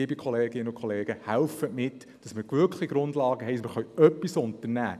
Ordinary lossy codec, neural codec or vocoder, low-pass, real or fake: none; none; 14.4 kHz; real